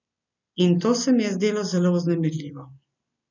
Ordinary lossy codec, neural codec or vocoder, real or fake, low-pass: none; none; real; 7.2 kHz